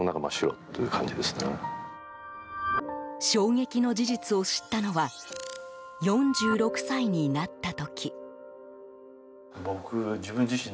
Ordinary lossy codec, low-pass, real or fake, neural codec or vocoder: none; none; real; none